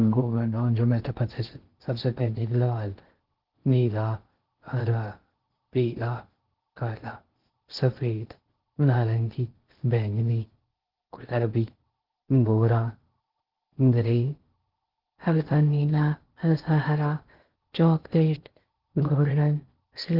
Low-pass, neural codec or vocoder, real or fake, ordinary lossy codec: 5.4 kHz; codec, 16 kHz in and 24 kHz out, 0.6 kbps, FocalCodec, streaming, 2048 codes; fake; Opus, 16 kbps